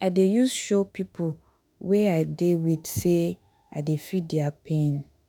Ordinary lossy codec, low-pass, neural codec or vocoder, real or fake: none; none; autoencoder, 48 kHz, 32 numbers a frame, DAC-VAE, trained on Japanese speech; fake